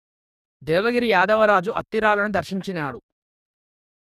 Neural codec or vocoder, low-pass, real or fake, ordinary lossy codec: codec, 44.1 kHz, 2.6 kbps, DAC; 14.4 kHz; fake; none